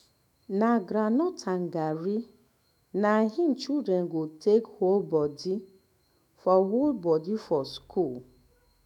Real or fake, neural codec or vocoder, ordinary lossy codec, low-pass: fake; autoencoder, 48 kHz, 128 numbers a frame, DAC-VAE, trained on Japanese speech; none; 19.8 kHz